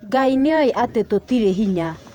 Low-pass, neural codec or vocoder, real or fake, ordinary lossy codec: 19.8 kHz; vocoder, 48 kHz, 128 mel bands, Vocos; fake; none